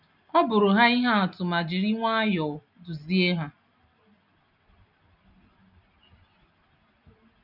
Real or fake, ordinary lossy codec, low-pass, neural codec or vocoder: real; none; 5.4 kHz; none